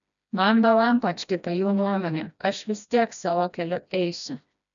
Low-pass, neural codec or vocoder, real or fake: 7.2 kHz; codec, 16 kHz, 1 kbps, FreqCodec, smaller model; fake